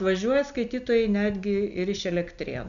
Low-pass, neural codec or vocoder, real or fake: 7.2 kHz; none; real